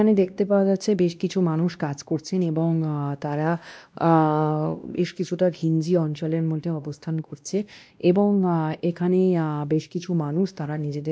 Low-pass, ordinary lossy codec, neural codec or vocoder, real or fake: none; none; codec, 16 kHz, 1 kbps, X-Codec, WavLM features, trained on Multilingual LibriSpeech; fake